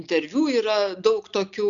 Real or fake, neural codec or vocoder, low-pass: real; none; 7.2 kHz